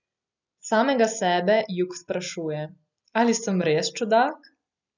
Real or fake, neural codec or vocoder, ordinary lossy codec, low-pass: real; none; none; 7.2 kHz